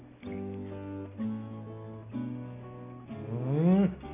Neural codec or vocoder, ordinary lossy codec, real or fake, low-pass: none; none; real; 3.6 kHz